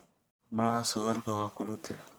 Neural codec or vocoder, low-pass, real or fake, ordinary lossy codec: codec, 44.1 kHz, 1.7 kbps, Pupu-Codec; none; fake; none